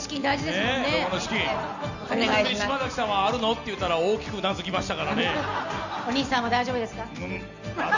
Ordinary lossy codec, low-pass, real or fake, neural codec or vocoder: none; 7.2 kHz; real; none